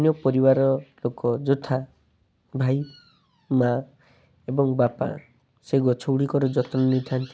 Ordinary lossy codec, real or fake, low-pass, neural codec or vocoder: none; real; none; none